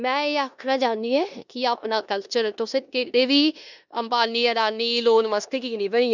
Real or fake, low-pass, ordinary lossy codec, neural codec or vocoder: fake; 7.2 kHz; none; codec, 16 kHz in and 24 kHz out, 0.9 kbps, LongCat-Audio-Codec, four codebook decoder